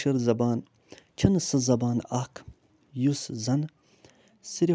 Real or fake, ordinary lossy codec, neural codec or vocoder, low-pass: real; none; none; none